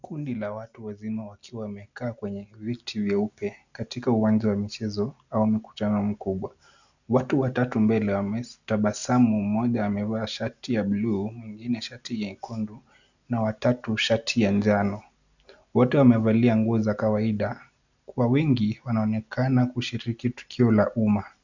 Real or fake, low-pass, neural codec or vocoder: real; 7.2 kHz; none